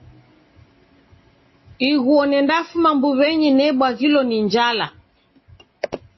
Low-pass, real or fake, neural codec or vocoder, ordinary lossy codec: 7.2 kHz; real; none; MP3, 24 kbps